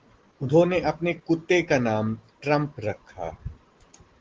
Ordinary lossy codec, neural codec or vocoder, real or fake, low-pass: Opus, 16 kbps; none; real; 7.2 kHz